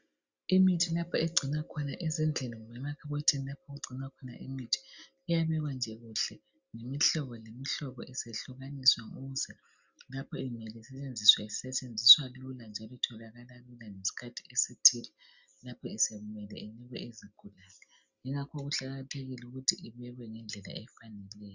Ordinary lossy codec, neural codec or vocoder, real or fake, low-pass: Opus, 64 kbps; none; real; 7.2 kHz